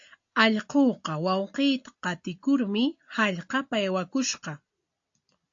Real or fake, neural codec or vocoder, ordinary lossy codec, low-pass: real; none; AAC, 48 kbps; 7.2 kHz